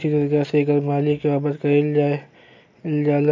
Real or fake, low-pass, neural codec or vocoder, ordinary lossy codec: real; 7.2 kHz; none; AAC, 48 kbps